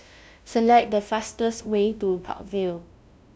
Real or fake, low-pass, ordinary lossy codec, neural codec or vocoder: fake; none; none; codec, 16 kHz, 0.5 kbps, FunCodec, trained on LibriTTS, 25 frames a second